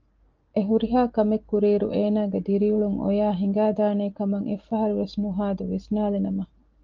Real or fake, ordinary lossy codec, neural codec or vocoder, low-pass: real; Opus, 24 kbps; none; 7.2 kHz